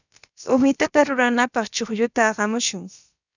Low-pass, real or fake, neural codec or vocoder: 7.2 kHz; fake; codec, 16 kHz, about 1 kbps, DyCAST, with the encoder's durations